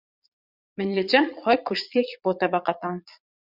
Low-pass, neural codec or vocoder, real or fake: 5.4 kHz; vocoder, 22.05 kHz, 80 mel bands, Vocos; fake